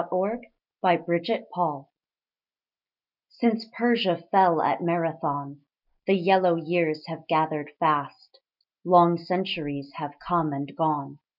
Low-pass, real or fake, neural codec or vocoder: 5.4 kHz; real; none